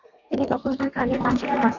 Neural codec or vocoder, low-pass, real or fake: codec, 32 kHz, 1.9 kbps, SNAC; 7.2 kHz; fake